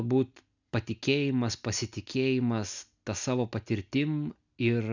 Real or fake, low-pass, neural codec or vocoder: real; 7.2 kHz; none